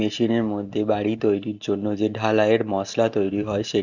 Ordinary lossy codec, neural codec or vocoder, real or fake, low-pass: none; none; real; 7.2 kHz